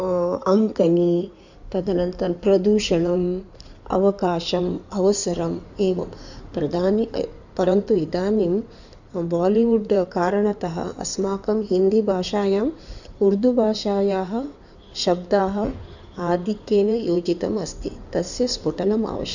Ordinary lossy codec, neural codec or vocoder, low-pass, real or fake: none; codec, 16 kHz in and 24 kHz out, 2.2 kbps, FireRedTTS-2 codec; 7.2 kHz; fake